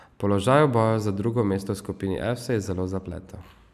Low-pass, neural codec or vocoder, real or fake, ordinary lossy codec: 14.4 kHz; none; real; none